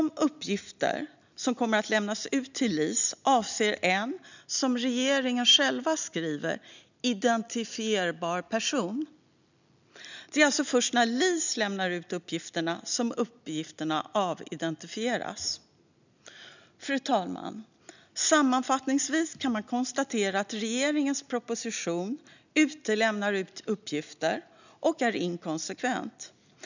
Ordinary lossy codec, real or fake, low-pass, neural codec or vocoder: none; real; 7.2 kHz; none